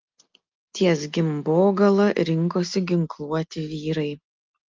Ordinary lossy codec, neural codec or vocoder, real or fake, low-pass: Opus, 24 kbps; none; real; 7.2 kHz